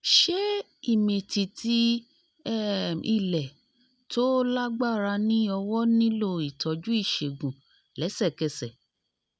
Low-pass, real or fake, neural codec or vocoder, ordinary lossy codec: none; real; none; none